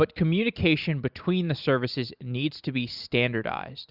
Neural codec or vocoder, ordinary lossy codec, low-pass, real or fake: none; Opus, 64 kbps; 5.4 kHz; real